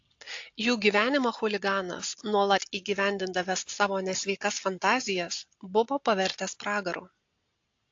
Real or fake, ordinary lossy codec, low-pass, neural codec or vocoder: real; AAC, 48 kbps; 7.2 kHz; none